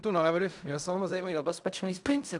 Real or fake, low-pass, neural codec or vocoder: fake; 10.8 kHz; codec, 16 kHz in and 24 kHz out, 0.4 kbps, LongCat-Audio-Codec, fine tuned four codebook decoder